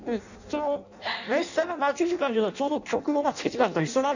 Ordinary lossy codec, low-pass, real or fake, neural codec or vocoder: none; 7.2 kHz; fake; codec, 16 kHz in and 24 kHz out, 0.6 kbps, FireRedTTS-2 codec